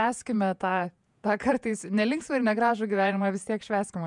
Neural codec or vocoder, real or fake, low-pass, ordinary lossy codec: vocoder, 48 kHz, 128 mel bands, Vocos; fake; 10.8 kHz; MP3, 96 kbps